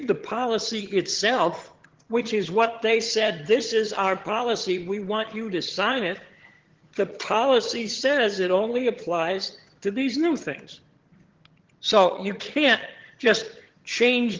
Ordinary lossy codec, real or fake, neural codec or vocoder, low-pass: Opus, 16 kbps; fake; vocoder, 22.05 kHz, 80 mel bands, HiFi-GAN; 7.2 kHz